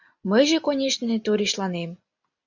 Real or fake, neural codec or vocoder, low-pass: real; none; 7.2 kHz